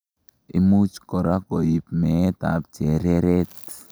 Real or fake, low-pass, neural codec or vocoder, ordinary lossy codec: real; none; none; none